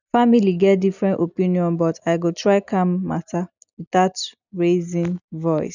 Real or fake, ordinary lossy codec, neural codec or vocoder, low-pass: real; none; none; 7.2 kHz